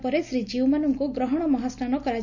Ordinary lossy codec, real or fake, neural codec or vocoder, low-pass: none; real; none; 7.2 kHz